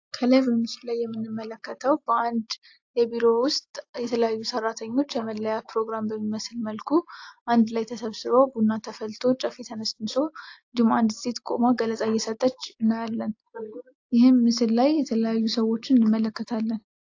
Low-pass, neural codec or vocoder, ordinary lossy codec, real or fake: 7.2 kHz; none; AAC, 48 kbps; real